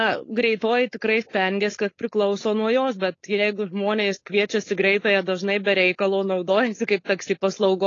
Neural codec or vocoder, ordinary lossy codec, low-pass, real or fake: codec, 16 kHz, 4.8 kbps, FACodec; AAC, 32 kbps; 7.2 kHz; fake